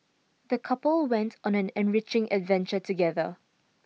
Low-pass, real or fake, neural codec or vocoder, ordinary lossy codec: none; real; none; none